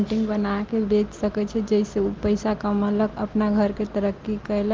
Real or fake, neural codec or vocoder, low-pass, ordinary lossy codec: real; none; 7.2 kHz; Opus, 16 kbps